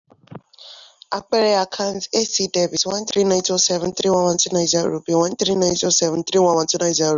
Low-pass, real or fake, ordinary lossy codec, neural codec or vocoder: 7.2 kHz; real; none; none